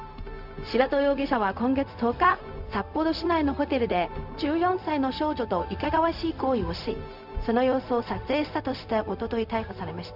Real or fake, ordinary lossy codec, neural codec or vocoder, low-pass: fake; none; codec, 16 kHz, 0.4 kbps, LongCat-Audio-Codec; 5.4 kHz